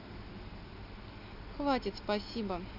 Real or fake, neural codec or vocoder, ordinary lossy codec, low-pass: real; none; none; 5.4 kHz